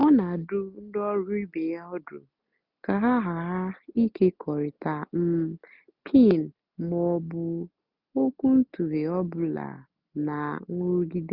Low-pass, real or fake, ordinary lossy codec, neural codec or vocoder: 5.4 kHz; real; none; none